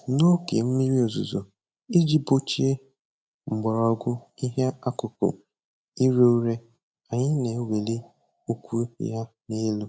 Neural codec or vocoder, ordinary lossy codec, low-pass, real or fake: none; none; none; real